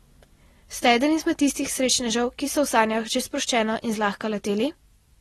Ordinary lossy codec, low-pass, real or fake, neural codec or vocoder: AAC, 32 kbps; 19.8 kHz; real; none